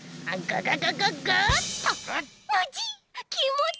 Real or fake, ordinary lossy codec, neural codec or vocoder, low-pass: real; none; none; none